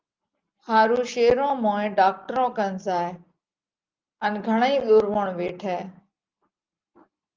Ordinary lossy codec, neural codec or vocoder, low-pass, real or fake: Opus, 32 kbps; none; 7.2 kHz; real